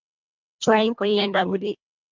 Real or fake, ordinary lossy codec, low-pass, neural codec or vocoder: fake; MP3, 64 kbps; 7.2 kHz; codec, 24 kHz, 1.5 kbps, HILCodec